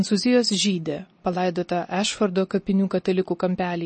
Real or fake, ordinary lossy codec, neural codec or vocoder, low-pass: real; MP3, 32 kbps; none; 10.8 kHz